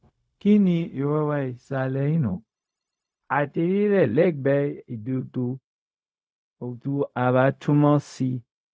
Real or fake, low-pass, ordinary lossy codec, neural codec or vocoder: fake; none; none; codec, 16 kHz, 0.4 kbps, LongCat-Audio-Codec